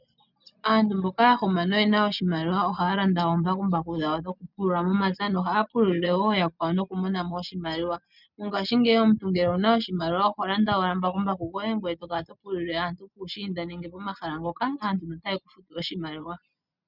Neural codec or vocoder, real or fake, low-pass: none; real; 5.4 kHz